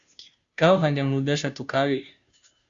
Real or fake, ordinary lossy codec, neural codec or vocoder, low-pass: fake; Opus, 64 kbps; codec, 16 kHz, 0.5 kbps, FunCodec, trained on Chinese and English, 25 frames a second; 7.2 kHz